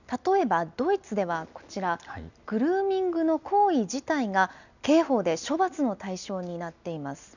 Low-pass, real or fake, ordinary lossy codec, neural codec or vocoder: 7.2 kHz; real; none; none